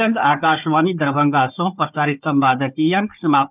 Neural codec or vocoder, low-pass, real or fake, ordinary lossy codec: codec, 16 kHz, 4 kbps, FunCodec, trained on Chinese and English, 50 frames a second; 3.6 kHz; fake; none